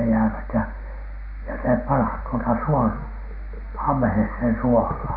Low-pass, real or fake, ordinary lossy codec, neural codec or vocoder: 5.4 kHz; real; none; none